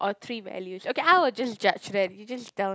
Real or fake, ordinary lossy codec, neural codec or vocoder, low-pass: real; none; none; none